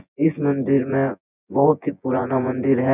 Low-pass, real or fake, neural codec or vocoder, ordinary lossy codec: 3.6 kHz; fake; vocoder, 24 kHz, 100 mel bands, Vocos; none